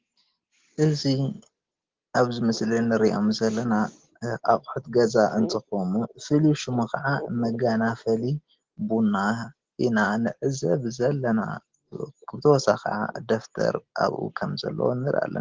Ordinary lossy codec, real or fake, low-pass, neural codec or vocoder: Opus, 16 kbps; real; 7.2 kHz; none